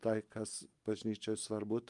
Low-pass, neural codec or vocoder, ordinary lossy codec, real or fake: 10.8 kHz; none; Opus, 32 kbps; real